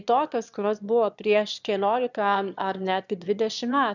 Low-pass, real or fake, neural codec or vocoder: 7.2 kHz; fake; autoencoder, 22.05 kHz, a latent of 192 numbers a frame, VITS, trained on one speaker